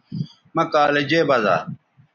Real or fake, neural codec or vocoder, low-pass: real; none; 7.2 kHz